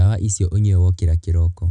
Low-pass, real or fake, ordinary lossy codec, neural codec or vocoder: 10.8 kHz; real; none; none